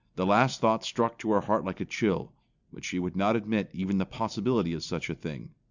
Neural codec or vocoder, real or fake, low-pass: none; real; 7.2 kHz